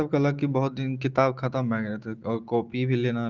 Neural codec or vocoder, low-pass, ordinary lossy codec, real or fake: none; 7.2 kHz; Opus, 16 kbps; real